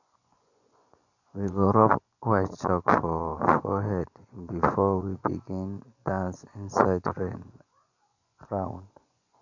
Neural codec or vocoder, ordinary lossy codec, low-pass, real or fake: vocoder, 24 kHz, 100 mel bands, Vocos; none; 7.2 kHz; fake